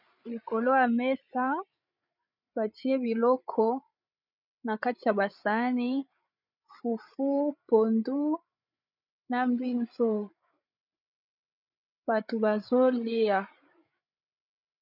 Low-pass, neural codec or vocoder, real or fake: 5.4 kHz; codec, 16 kHz, 8 kbps, FreqCodec, larger model; fake